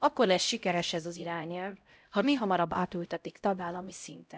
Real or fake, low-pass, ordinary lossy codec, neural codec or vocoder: fake; none; none; codec, 16 kHz, 0.5 kbps, X-Codec, HuBERT features, trained on LibriSpeech